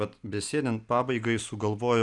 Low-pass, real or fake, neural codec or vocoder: 10.8 kHz; real; none